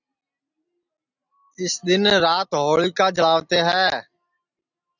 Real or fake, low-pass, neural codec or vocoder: real; 7.2 kHz; none